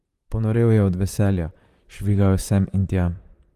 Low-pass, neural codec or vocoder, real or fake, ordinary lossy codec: 14.4 kHz; vocoder, 44.1 kHz, 128 mel bands, Pupu-Vocoder; fake; Opus, 32 kbps